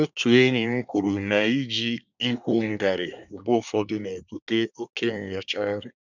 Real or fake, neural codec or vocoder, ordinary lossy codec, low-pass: fake; codec, 24 kHz, 1 kbps, SNAC; none; 7.2 kHz